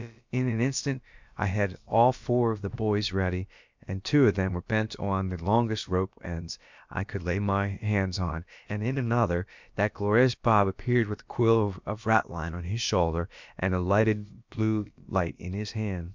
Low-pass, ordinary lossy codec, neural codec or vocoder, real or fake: 7.2 kHz; MP3, 64 kbps; codec, 16 kHz, about 1 kbps, DyCAST, with the encoder's durations; fake